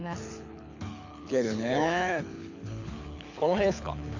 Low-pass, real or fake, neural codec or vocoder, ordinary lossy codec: 7.2 kHz; fake; codec, 24 kHz, 6 kbps, HILCodec; AAC, 48 kbps